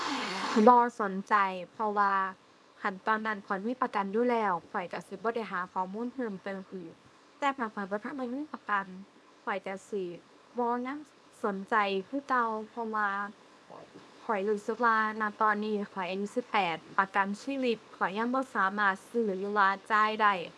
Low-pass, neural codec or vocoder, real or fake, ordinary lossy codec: none; codec, 24 kHz, 0.9 kbps, WavTokenizer, small release; fake; none